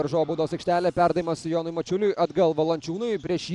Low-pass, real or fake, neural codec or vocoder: 10.8 kHz; real; none